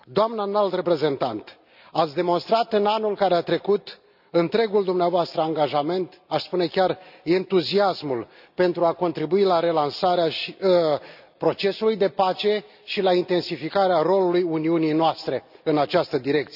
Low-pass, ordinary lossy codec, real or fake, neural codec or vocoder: 5.4 kHz; none; real; none